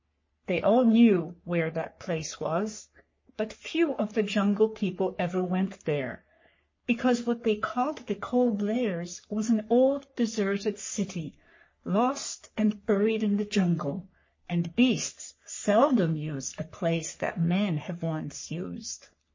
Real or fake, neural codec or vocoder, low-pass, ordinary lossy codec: fake; codec, 44.1 kHz, 3.4 kbps, Pupu-Codec; 7.2 kHz; MP3, 32 kbps